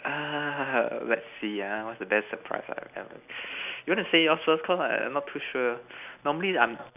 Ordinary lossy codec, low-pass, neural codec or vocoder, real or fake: none; 3.6 kHz; none; real